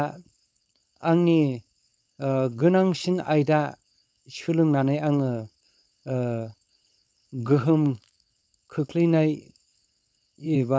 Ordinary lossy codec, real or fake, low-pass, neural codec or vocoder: none; fake; none; codec, 16 kHz, 4.8 kbps, FACodec